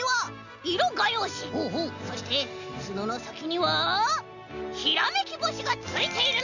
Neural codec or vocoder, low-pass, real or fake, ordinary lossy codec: none; 7.2 kHz; real; none